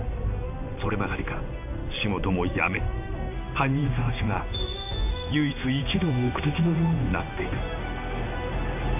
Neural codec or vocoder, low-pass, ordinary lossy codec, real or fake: codec, 16 kHz in and 24 kHz out, 1 kbps, XY-Tokenizer; 3.6 kHz; none; fake